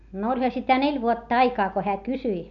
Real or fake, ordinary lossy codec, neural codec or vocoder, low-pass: real; none; none; 7.2 kHz